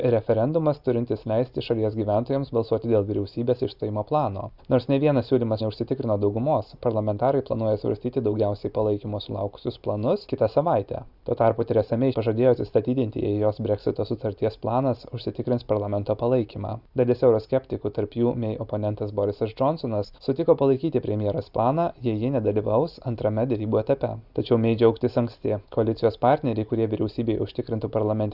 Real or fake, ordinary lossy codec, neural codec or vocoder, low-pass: real; Opus, 64 kbps; none; 5.4 kHz